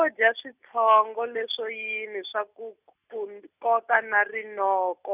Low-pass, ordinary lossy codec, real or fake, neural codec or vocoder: 3.6 kHz; none; real; none